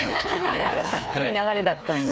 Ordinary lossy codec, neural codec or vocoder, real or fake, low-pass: none; codec, 16 kHz, 2 kbps, FreqCodec, larger model; fake; none